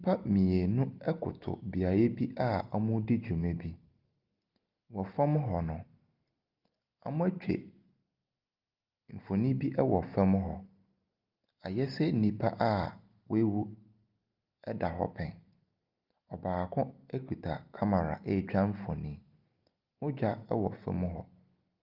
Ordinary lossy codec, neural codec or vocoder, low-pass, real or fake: Opus, 32 kbps; none; 5.4 kHz; real